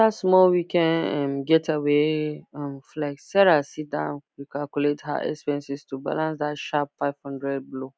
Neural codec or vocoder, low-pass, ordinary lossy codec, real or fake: none; none; none; real